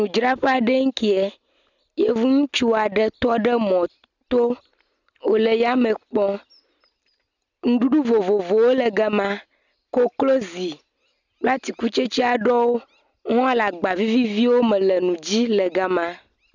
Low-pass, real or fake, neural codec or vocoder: 7.2 kHz; real; none